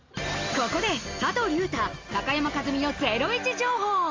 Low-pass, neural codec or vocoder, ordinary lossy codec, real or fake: 7.2 kHz; none; Opus, 32 kbps; real